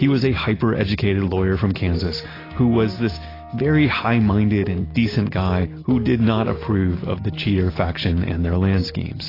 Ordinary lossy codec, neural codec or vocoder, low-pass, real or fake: AAC, 24 kbps; none; 5.4 kHz; real